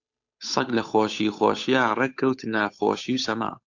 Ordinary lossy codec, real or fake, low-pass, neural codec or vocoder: AAC, 48 kbps; fake; 7.2 kHz; codec, 16 kHz, 8 kbps, FunCodec, trained on Chinese and English, 25 frames a second